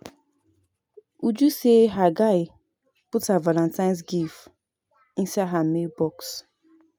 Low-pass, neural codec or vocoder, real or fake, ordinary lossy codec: none; none; real; none